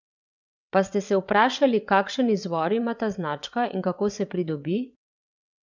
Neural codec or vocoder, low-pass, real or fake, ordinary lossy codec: vocoder, 22.05 kHz, 80 mel bands, WaveNeXt; 7.2 kHz; fake; none